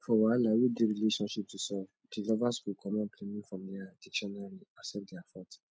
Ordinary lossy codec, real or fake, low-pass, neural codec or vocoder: none; real; none; none